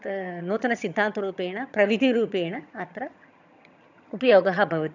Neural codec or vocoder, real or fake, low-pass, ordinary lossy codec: vocoder, 22.05 kHz, 80 mel bands, HiFi-GAN; fake; 7.2 kHz; none